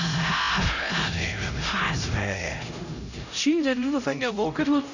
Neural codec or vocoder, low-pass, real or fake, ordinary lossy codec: codec, 16 kHz, 0.5 kbps, X-Codec, HuBERT features, trained on LibriSpeech; 7.2 kHz; fake; none